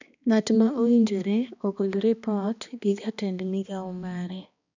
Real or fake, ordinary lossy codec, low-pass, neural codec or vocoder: fake; none; 7.2 kHz; codec, 16 kHz, 2 kbps, X-Codec, HuBERT features, trained on balanced general audio